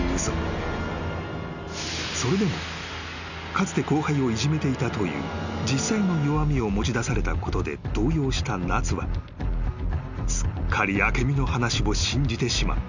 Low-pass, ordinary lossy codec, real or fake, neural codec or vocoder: 7.2 kHz; none; real; none